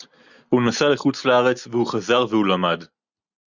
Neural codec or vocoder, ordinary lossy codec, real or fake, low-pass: none; Opus, 64 kbps; real; 7.2 kHz